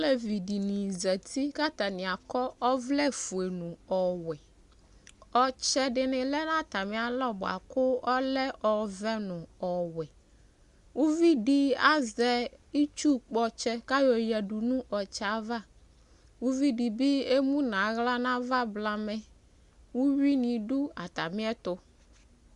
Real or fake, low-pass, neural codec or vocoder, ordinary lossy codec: real; 10.8 kHz; none; Opus, 64 kbps